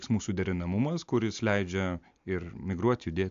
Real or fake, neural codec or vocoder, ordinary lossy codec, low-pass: real; none; AAC, 64 kbps; 7.2 kHz